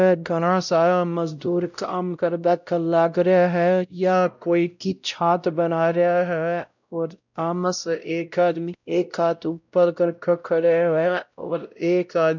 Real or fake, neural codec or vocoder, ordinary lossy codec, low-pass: fake; codec, 16 kHz, 0.5 kbps, X-Codec, WavLM features, trained on Multilingual LibriSpeech; none; 7.2 kHz